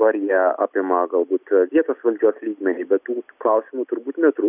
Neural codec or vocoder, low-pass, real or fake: none; 3.6 kHz; real